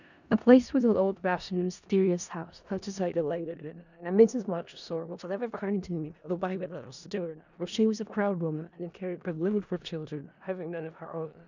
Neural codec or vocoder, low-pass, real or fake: codec, 16 kHz in and 24 kHz out, 0.4 kbps, LongCat-Audio-Codec, four codebook decoder; 7.2 kHz; fake